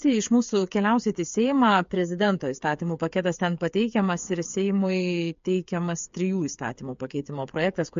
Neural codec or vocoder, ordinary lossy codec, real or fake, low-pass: codec, 16 kHz, 8 kbps, FreqCodec, smaller model; MP3, 48 kbps; fake; 7.2 kHz